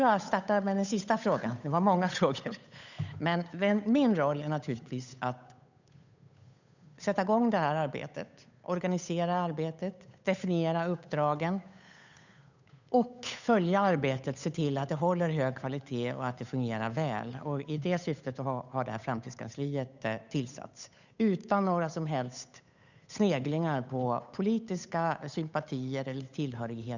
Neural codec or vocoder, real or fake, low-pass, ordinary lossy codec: codec, 16 kHz, 8 kbps, FunCodec, trained on Chinese and English, 25 frames a second; fake; 7.2 kHz; none